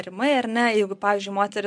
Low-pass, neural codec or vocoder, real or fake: 9.9 kHz; none; real